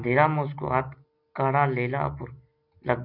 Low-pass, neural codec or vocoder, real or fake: 5.4 kHz; none; real